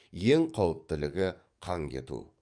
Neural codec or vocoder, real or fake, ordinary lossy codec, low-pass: codec, 44.1 kHz, 7.8 kbps, DAC; fake; none; 9.9 kHz